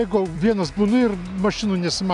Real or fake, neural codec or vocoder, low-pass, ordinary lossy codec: real; none; 10.8 kHz; MP3, 96 kbps